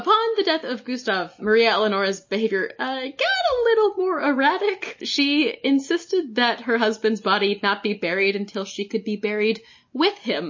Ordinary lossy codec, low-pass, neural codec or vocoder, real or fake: MP3, 32 kbps; 7.2 kHz; none; real